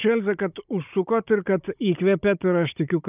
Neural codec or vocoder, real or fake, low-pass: codec, 16 kHz, 16 kbps, FunCodec, trained on Chinese and English, 50 frames a second; fake; 3.6 kHz